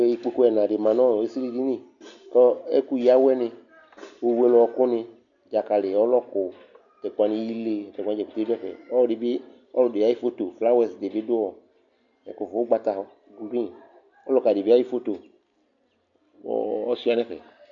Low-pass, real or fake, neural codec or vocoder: 7.2 kHz; real; none